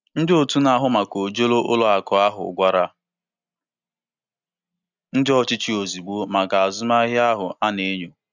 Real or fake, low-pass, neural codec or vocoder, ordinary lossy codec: real; 7.2 kHz; none; none